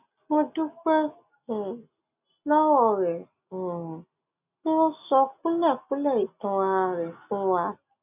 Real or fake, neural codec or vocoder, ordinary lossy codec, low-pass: real; none; none; 3.6 kHz